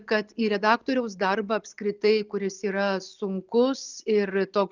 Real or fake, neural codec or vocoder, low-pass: real; none; 7.2 kHz